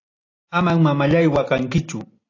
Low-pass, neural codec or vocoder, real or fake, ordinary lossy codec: 7.2 kHz; none; real; AAC, 32 kbps